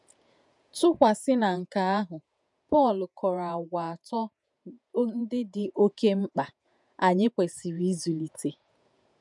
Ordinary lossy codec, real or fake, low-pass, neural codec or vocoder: none; fake; 10.8 kHz; vocoder, 48 kHz, 128 mel bands, Vocos